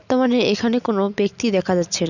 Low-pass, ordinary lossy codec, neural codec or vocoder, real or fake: 7.2 kHz; none; none; real